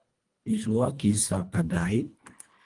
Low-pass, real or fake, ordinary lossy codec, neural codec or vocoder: 10.8 kHz; fake; Opus, 32 kbps; codec, 24 kHz, 1.5 kbps, HILCodec